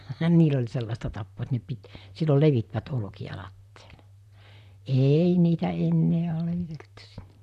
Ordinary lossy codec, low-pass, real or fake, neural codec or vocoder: none; 14.4 kHz; fake; vocoder, 44.1 kHz, 128 mel bands, Pupu-Vocoder